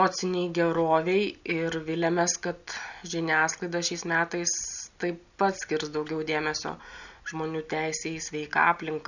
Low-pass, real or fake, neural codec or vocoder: 7.2 kHz; real; none